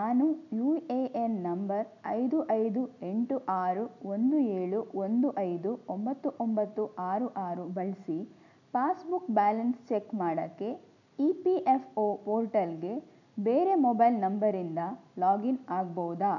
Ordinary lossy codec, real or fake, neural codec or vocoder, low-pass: none; real; none; 7.2 kHz